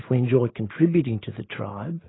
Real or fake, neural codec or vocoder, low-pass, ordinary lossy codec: real; none; 7.2 kHz; AAC, 16 kbps